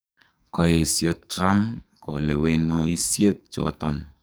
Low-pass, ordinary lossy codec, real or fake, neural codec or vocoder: none; none; fake; codec, 44.1 kHz, 2.6 kbps, SNAC